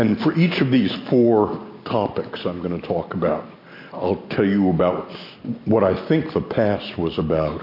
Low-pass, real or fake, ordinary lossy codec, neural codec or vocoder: 5.4 kHz; real; MP3, 32 kbps; none